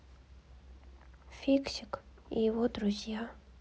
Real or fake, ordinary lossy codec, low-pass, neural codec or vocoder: real; none; none; none